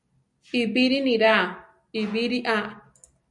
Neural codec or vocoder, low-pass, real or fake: none; 10.8 kHz; real